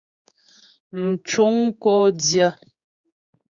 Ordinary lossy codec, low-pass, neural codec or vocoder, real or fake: Opus, 64 kbps; 7.2 kHz; codec, 16 kHz, 4 kbps, X-Codec, HuBERT features, trained on general audio; fake